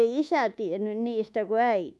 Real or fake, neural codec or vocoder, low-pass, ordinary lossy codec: fake; codec, 24 kHz, 1.2 kbps, DualCodec; none; none